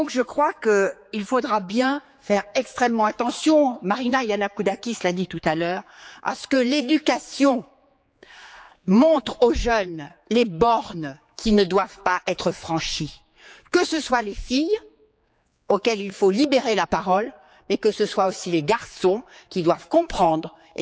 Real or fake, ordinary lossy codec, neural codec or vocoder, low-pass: fake; none; codec, 16 kHz, 4 kbps, X-Codec, HuBERT features, trained on general audio; none